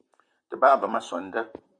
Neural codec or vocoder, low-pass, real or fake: vocoder, 44.1 kHz, 128 mel bands, Pupu-Vocoder; 9.9 kHz; fake